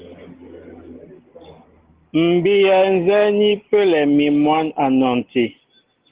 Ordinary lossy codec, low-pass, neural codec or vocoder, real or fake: Opus, 16 kbps; 3.6 kHz; none; real